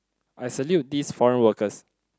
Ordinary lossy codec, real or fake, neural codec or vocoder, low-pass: none; real; none; none